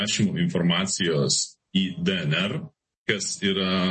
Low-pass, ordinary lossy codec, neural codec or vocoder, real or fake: 9.9 kHz; MP3, 32 kbps; none; real